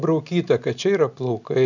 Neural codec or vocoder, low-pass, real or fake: none; 7.2 kHz; real